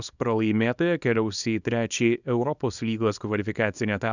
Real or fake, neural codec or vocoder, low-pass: fake; codec, 24 kHz, 0.9 kbps, WavTokenizer, small release; 7.2 kHz